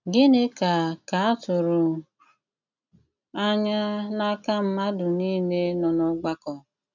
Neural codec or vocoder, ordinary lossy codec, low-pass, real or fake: none; none; 7.2 kHz; real